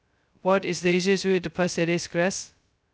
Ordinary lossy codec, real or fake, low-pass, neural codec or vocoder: none; fake; none; codec, 16 kHz, 0.2 kbps, FocalCodec